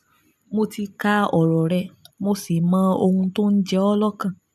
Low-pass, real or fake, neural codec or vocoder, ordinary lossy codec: 14.4 kHz; real; none; none